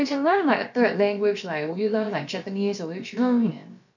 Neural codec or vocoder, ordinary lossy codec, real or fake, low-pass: codec, 16 kHz, about 1 kbps, DyCAST, with the encoder's durations; none; fake; 7.2 kHz